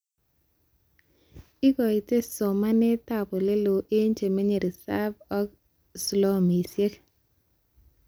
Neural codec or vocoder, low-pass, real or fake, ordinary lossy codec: none; none; real; none